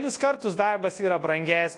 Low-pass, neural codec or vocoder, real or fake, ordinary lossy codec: 10.8 kHz; codec, 24 kHz, 0.9 kbps, WavTokenizer, large speech release; fake; AAC, 48 kbps